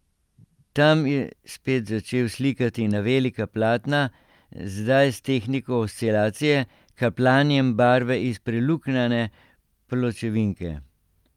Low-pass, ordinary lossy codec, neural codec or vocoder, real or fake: 19.8 kHz; Opus, 32 kbps; none; real